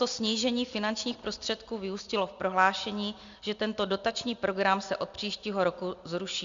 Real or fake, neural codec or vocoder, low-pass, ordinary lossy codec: real; none; 7.2 kHz; Opus, 64 kbps